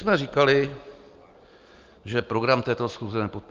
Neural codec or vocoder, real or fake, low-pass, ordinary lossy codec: none; real; 7.2 kHz; Opus, 32 kbps